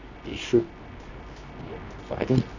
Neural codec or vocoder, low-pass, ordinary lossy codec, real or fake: codec, 24 kHz, 0.9 kbps, WavTokenizer, medium music audio release; 7.2 kHz; none; fake